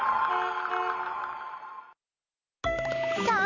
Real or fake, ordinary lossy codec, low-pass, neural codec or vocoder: real; none; 7.2 kHz; none